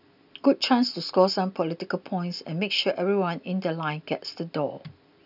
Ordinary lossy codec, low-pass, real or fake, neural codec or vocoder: none; 5.4 kHz; real; none